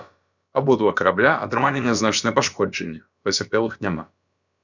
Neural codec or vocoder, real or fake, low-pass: codec, 16 kHz, about 1 kbps, DyCAST, with the encoder's durations; fake; 7.2 kHz